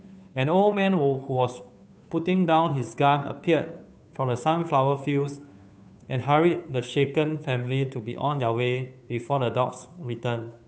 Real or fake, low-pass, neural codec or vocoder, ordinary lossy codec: fake; none; codec, 16 kHz, 2 kbps, FunCodec, trained on Chinese and English, 25 frames a second; none